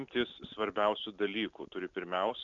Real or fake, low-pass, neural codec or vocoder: real; 7.2 kHz; none